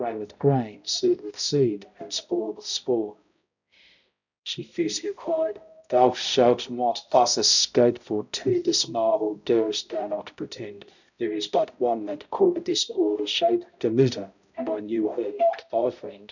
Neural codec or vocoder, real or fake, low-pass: codec, 16 kHz, 0.5 kbps, X-Codec, HuBERT features, trained on balanced general audio; fake; 7.2 kHz